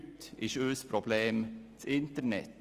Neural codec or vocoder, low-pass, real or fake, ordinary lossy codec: none; 14.4 kHz; real; Opus, 32 kbps